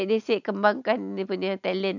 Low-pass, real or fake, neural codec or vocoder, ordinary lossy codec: 7.2 kHz; real; none; none